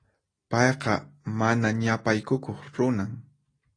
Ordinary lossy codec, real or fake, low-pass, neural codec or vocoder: AAC, 32 kbps; real; 9.9 kHz; none